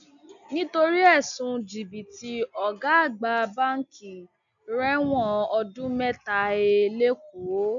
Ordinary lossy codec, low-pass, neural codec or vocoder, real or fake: none; 7.2 kHz; none; real